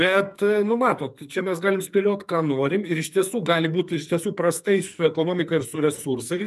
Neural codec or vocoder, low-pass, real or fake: codec, 44.1 kHz, 2.6 kbps, SNAC; 14.4 kHz; fake